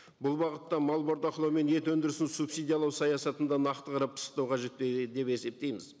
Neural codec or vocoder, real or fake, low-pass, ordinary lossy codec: none; real; none; none